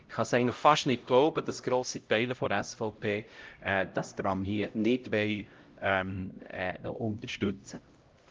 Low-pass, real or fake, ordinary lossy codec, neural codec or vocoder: 7.2 kHz; fake; Opus, 32 kbps; codec, 16 kHz, 0.5 kbps, X-Codec, HuBERT features, trained on LibriSpeech